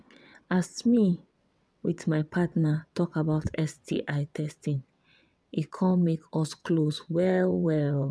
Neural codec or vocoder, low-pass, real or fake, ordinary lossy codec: vocoder, 22.05 kHz, 80 mel bands, WaveNeXt; none; fake; none